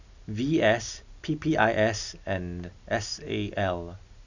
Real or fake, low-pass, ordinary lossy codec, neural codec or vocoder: real; 7.2 kHz; none; none